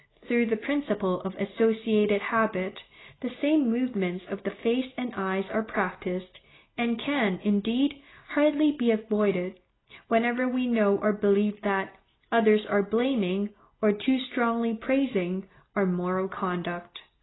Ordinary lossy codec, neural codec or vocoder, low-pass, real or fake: AAC, 16 kbps; none; 7.2 kHz; real